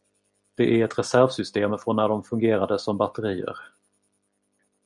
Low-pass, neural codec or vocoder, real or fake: 10.8 kHz; none; real